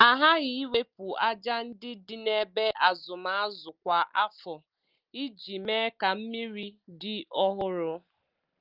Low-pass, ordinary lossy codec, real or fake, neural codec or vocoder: 5.4 kHz; Opus, 24 kbps; real; none